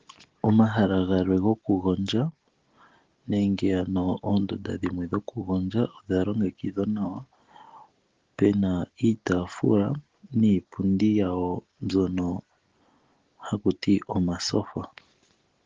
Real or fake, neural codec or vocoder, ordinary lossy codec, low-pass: real; none; Opus, 16 kbps; 7.2 kHz